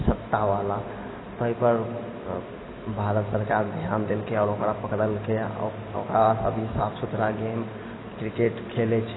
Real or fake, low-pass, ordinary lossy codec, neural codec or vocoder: real; 7.2 kHz; AAC, 16 kbps; none